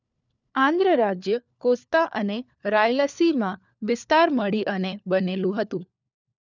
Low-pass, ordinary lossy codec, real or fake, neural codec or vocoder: 7.2 kHz; none; fake; codec, 16 kHz, 4 kbps, FunCodec, trained on LibriTTS, 50 frames a second